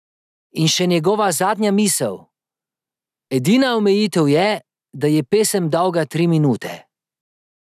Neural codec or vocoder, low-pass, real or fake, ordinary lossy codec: none; 14.4 kHz; real; none